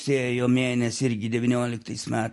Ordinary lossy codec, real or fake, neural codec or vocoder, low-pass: MP3, 48 kbps; real; none; 14.4 kHz